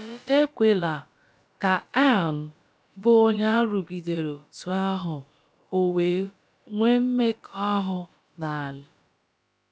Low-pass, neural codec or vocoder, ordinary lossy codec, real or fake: none; codec, 16 kHz, about 1 kbps, DyCAST, with the encoder's durations; none; fake